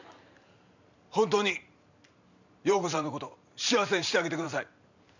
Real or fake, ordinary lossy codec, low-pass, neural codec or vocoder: fake; none; 7.2 kHz; vocoder, 44.1 kHz, 128 mel bands every 512 samples, BigVGAN v2